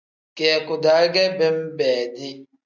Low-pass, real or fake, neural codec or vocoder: 7.2 kHz; real; none